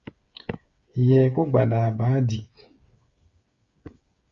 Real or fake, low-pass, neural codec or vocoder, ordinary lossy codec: fake; 7.2 kHz; codec, 16 kHz, 8 kbps, FreqCodec, smaller model; Opus, 64 kbps